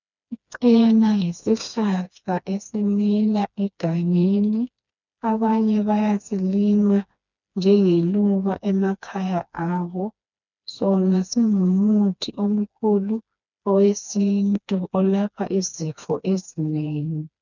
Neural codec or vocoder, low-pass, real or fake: codec, 16 kHz, 2 kbps, FreqCodec, smaller model; 7.2 kHz; fake